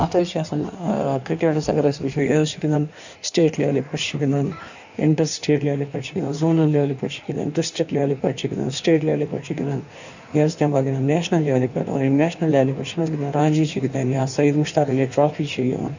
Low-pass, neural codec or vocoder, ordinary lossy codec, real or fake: 7.2 kHz; codec, 16 kHz in and 24 kHz out, 1.1 kbps, FireRedTTS-2 codec; none; fake